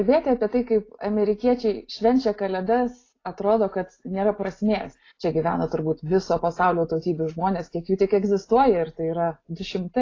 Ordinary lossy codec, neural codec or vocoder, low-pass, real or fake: AAC, 32 kbps; none; 7.2 kHz; real